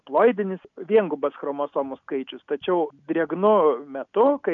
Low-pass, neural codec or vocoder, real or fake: 7.2 kHz; none; real